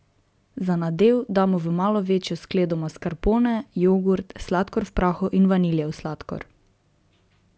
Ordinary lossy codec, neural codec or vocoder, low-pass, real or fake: none; none; none; real